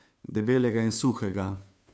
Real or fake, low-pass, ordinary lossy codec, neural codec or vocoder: fake; none; none; codec, 16 kHz, 2 kbps, FunCodec, trained on Chinese and English, 25 frames a second